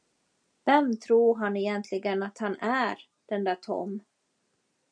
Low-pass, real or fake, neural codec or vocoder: 9.9 kHz; real; none